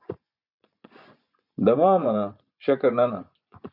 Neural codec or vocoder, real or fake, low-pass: vocoder, 24 kHz, 100 mel bands, Vocos; fake; 5.4 kHz